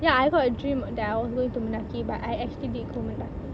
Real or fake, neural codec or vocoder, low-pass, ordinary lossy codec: real; none; none; none